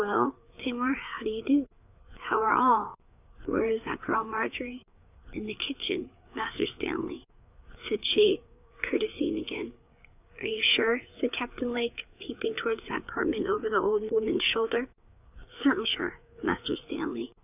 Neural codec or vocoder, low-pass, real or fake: codec, 16 kHz, 4 kbps, FreqCodec, larger model; 3.6 kHz; fake